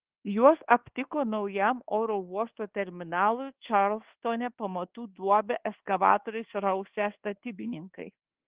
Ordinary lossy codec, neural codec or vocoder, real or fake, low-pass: Opus, 16 kbps; codec, 24 kHz, 1.2 kbps, DualCodec; fake; 3.6 kHz